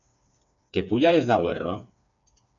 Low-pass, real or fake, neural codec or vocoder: 7.2 kHz; fake; codec, 16 kHz, 4 kbps, FreqCodec, smaller model